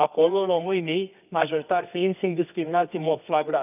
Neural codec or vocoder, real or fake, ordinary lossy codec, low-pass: codec, 24 kHz, 0.9 kbps, WavTokenizer, medium music audio release; fake; none; 3.6 kHz